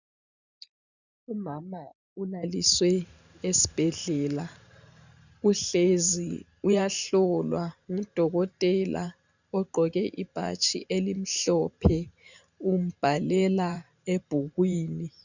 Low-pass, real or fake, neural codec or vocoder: 7.2 kHz; fake; vocoder, 44.1 kHz, 128 mel bands every 512 samples, BigVGAN v2